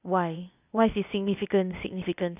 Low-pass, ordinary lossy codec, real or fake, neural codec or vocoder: 3.6 kHz; none; fake; codec, 16 kHz, 0.8 kbps, ZipCodec